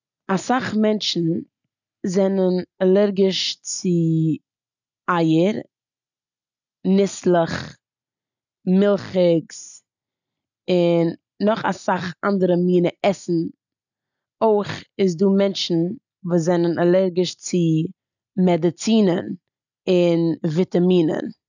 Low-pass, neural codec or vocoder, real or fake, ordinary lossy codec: 7.2 kHz; none; real; none